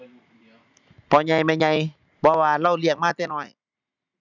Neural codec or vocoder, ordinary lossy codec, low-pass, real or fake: none; none; 7.2 kHz; real